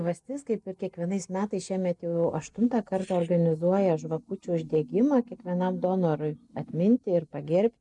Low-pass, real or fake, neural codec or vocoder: 10.8 kHz; real; none